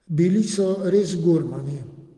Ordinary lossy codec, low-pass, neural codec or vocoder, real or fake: Opus, 24 kbps; 10.8 kHz; none; real